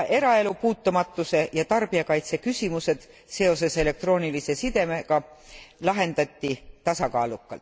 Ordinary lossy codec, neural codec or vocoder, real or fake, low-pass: none; none; real; none